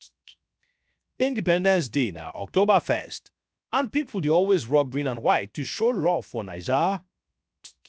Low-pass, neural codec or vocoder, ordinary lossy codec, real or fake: none; codec, 16 kHz, 0.7 kbps, FocalCodec; none; fake